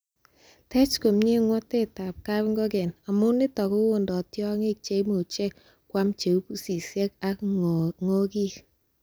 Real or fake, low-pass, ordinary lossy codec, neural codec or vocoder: real; none; none; none